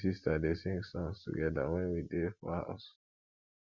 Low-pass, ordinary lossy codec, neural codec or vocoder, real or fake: 7.2 kHz; Opus, 64 kbps; none; real